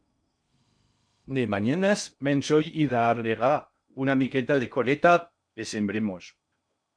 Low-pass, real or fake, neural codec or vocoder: 9.9 kHz; fake; codec, 16 kHz in and 24 kHz out, 0.6 kbps, FocalCodec, streaming, 2048 codes